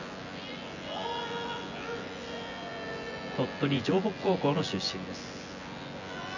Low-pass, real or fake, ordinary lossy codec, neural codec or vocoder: 7.2 kHz; fake; none; vocoder, 24 kHz, 100 mel bands, Vocos